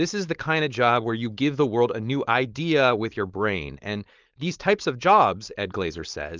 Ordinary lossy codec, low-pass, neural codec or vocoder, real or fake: Opus, 32 kbps; 7.2 kHz; codec, 16 kHz, 4.8 kbps, FACodec; fake